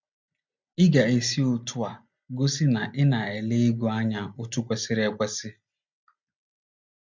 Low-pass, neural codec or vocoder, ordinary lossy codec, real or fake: 7.2 kHz; none; MP3, 64 kbps; real